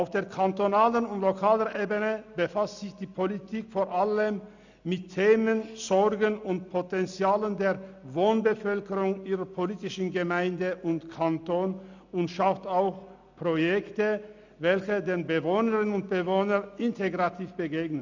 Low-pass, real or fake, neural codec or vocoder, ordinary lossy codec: 7.2 kHz; real; none; none